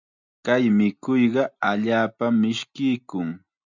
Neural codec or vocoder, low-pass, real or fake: none; 7.2 kHz; real